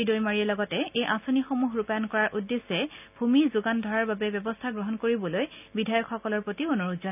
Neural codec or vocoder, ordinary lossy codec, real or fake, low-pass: none; none; real; 3.6 kHz